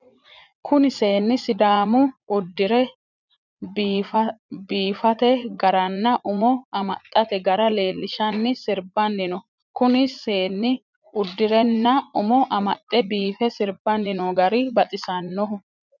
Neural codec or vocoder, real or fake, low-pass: vocoder, 44.1 kHz, 80 mel bands, Vocos; fake; 7.2 kHz